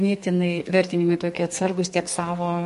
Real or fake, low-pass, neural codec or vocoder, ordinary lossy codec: fake; 14.4 kHz; codec, 32 kHz, 1.9 kbps, SNAC; MP3, 48 kbps